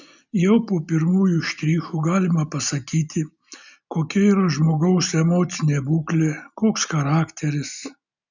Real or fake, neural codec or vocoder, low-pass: real; none; 7.2 kHz